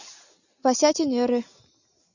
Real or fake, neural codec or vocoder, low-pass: real; none; 7.2 kHz